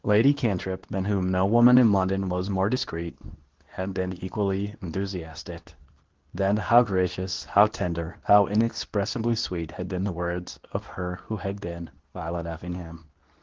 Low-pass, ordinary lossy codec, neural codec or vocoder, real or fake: 7.2 kHz; Opus, 16 kbps; codec, 24 kHz, 0.9 kbps, WavTokenizer, medium speech release version 2; fake